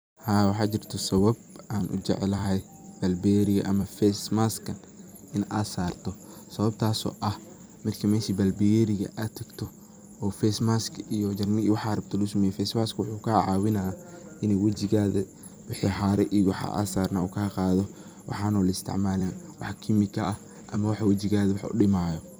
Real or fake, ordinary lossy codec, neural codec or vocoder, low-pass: fake; none; vocoder, 44.1 kHz, 128 mel bands every 256 samples, BigVGAN v2; none